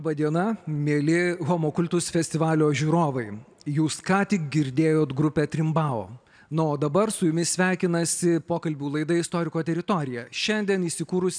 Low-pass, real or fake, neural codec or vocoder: 9.9 kHz; real; none